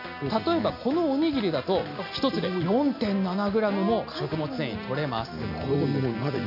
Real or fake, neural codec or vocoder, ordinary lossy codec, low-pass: real; none; AAC, 48 kbps; 5.4 kHz